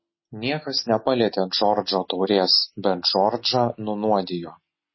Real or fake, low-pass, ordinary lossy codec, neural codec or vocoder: real; 7.2 kHz; MP3, 24 kbps; none